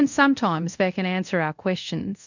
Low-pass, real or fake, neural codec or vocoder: 7.2 kHz; fake; codec, 24 kHz, 0.5 kbps, DualCodec